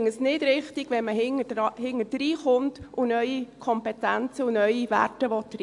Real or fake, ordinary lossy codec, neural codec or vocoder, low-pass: real; AAC, 64 kbps; none; 10.8 kHz